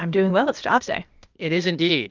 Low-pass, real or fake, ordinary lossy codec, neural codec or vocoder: 7.2 kHz; fake; Opus, 24 kbps; codec, 16 kHz, 0.8 kbps, ZipCodec